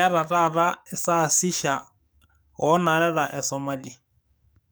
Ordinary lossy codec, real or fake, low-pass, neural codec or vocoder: none; fake; none; codec, 44.1 kHz, 7.8 kbps, DAC